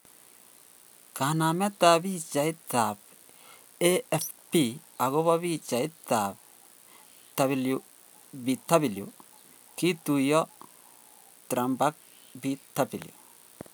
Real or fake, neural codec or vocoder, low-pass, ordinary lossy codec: real; none; none; none